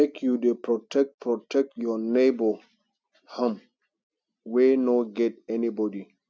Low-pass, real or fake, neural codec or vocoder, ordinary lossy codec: none; real; none; none